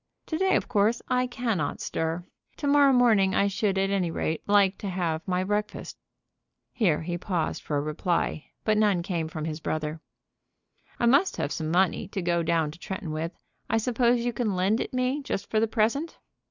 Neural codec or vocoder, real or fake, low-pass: none; real; 7.2 kHz